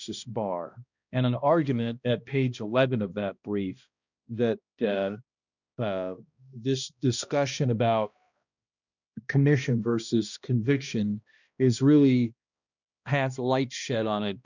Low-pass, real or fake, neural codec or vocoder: 7.2 kHz; fake; codec, 16 kHz, 1 kbps, X-Codec, HuBERT features, trained on balanced general audio